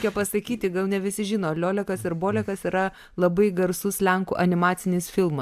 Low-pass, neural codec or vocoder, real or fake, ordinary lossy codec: 14.4 kHz; none; real; AAC, 96 kbps